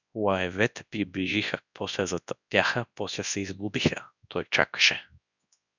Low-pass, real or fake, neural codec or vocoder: 7.2 kHz; fake; codec, 24 kHz, 0.9 kbps, WavTokenizer, large speech release